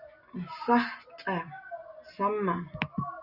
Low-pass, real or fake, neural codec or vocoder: 5.4 kHz; real; none